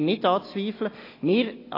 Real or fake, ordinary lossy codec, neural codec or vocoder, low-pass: real; AAC, 24 kbps; none; 5.4 kHz